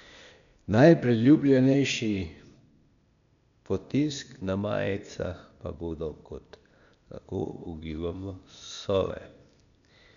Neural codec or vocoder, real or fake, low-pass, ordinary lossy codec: codec, 16 kHz, 0.8 kbps, ZipCodec; fake; 7.2 kHz; none